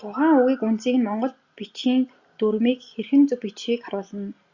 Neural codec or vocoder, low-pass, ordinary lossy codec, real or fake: none; 7.2 kHz; Opus, 64 kbps; real